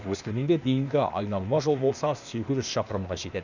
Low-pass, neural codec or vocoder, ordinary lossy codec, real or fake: 7.2 kHz; codec, 16 kHz, 0.8 kbps, ZipCodec; none; fake